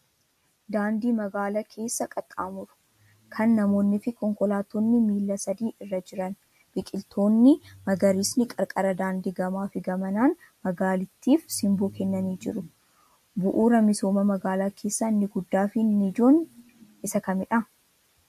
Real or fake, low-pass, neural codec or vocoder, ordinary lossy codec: real; 14.4 kHz; none; MP3, 64 kbps